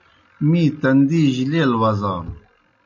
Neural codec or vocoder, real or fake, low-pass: none; real; 7.2 kHz